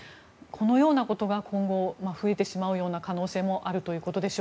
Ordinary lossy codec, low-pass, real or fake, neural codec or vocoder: none; none; real; none